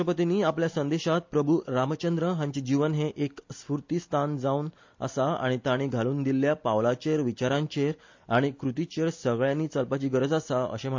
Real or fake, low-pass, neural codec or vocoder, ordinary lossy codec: real; 7.2 kHz; none; MP3, 48 kbps